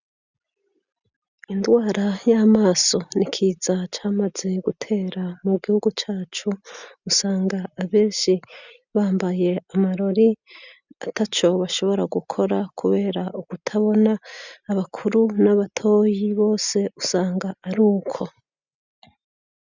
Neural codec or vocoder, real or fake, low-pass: none; real; 7.2 kHz